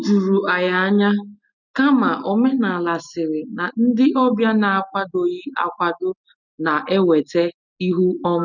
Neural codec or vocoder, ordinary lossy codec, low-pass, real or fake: none; none; 7.2 kHz; real